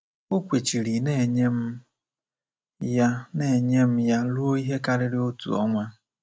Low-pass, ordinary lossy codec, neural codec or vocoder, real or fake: none; none; none; real